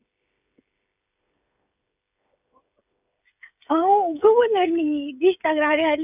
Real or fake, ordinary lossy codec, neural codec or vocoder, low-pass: fake; none; codec, 16 kHz, 16 kbps, FreqCodec, smaller model; 3.6 kHz